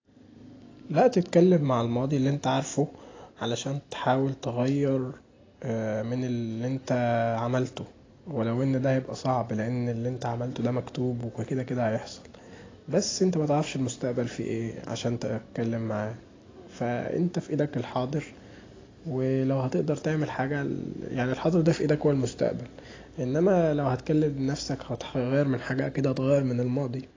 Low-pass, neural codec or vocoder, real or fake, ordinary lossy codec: 7.2 kHz; none; real; AAC, 32 kbps